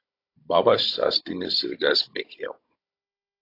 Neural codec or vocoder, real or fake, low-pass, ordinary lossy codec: codec, 16 kHz, 16 kbps, FunCodec, trained on Chinese and English, 50 frames a second; fake; 5.4 kHz; AAC, 32 kbps